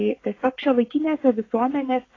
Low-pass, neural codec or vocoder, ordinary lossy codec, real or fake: 7.2 kHz; codec, 44.1 kHz, 7.8 kbps, Pupu-Codec; AAC, 32 kbps; fake